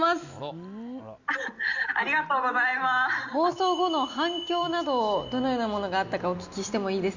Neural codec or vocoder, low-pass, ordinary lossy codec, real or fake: none; 7.2 kHz; Opus, 64 kbps; real